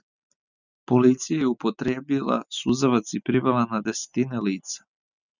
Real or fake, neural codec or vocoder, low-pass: real; none; 7.2 kHz